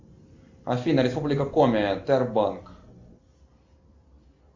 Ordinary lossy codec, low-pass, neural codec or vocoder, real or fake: MP3, 64 kbps; 7.2 kHz; none; real